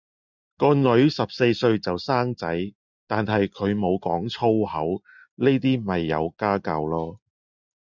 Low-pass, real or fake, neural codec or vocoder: 7.2 kHz; real; none